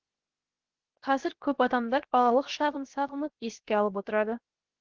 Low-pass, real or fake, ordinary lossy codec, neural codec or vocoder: 7.2 kHz; fake; Opus, 16 kbps; codec, 16 kHz, 0.7 kbps, FocalCodec